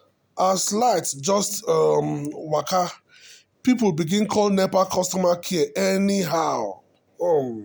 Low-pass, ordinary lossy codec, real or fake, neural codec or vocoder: none; none; real; none